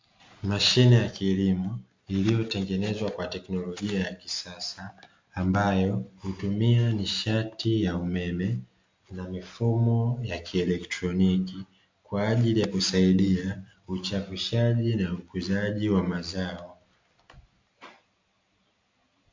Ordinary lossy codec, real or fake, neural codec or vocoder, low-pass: MP3, 48 kbps; real; none; 7.2 kHz